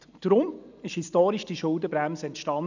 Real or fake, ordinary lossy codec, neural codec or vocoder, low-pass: real; none; none; 7.2 kHz